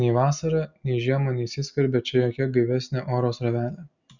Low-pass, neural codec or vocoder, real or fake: 7.2 kHz; none; real